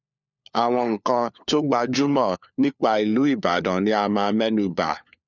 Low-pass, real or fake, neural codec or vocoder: 7.2 kHz; fake; codec, 16 kHz, 4 kbps, FunCodec, trained on LibriTTS, 50 frames a second